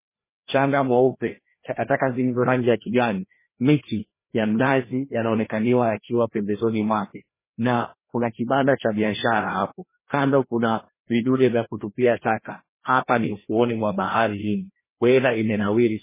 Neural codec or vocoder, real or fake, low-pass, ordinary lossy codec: codec, 16 kHz, 1 kbps, FreqCodec, larger model; fake; 3.6 kHz; MP3, 16 kbps